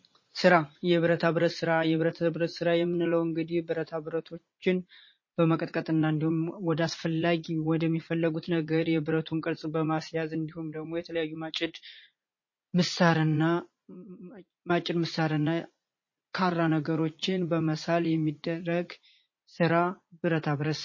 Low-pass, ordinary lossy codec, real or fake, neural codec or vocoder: 7.2 kHz; MP3, 32 kbps; fake; vocoder, 44.1 kHz, 80 mel bands, Vocos